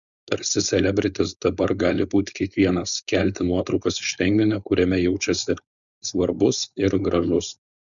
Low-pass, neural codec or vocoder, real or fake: 7.2 kHz; codec, 16 kHz, 4.8 kbps, FACodec; fake